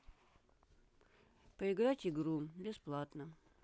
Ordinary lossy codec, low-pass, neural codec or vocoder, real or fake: none; none; none; real